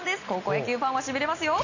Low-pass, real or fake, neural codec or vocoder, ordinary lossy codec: 7.2 kHz; real; none; none